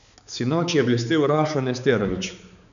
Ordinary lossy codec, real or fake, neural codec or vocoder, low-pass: none; fake; codec, 16 kHz, 4 kbps, X-Codec, HuBERT features, trained on balanced general audio; 7.2 kHz